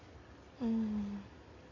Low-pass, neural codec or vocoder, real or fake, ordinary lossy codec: 7.2 kHz; none; real; AAC, 32 kbps